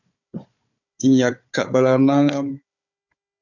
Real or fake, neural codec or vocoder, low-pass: fake; codec, 16 kHz, 4 kbps, FunCodec, trained on Chinese and English, 50 frames a second; 7.2 kHz